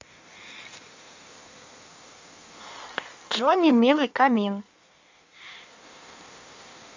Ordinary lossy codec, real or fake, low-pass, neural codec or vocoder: MP3, 64 kbps; fake; 7.2 kHz; codec, 16 kHz in and 24 kHz out, 1.1 kbps, FireRedTTS-2 codec